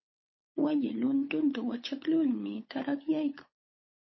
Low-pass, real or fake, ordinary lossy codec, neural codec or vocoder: 7.2 kHz; fake; MP3, 24 kbps; codec, 16 kHz, 8 kbps, FunCodec, trained on Chinese and English, 25 frames a second